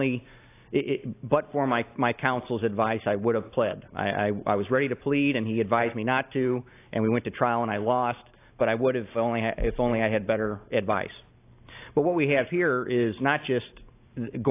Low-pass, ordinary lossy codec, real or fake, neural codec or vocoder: 3.6 kHz; AAC, 24 kbps; real; none